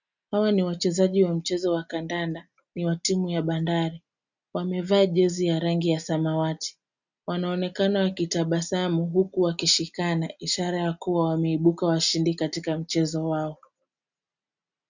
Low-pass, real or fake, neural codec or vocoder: 7.2 kHz; real; none